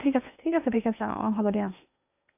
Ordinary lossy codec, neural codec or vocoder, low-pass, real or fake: none; codec, 16 kHz in and 24 kHz out, 0.8 kbps, FocalCodec, streaming, 65536 codes; 3.6 kHz; fake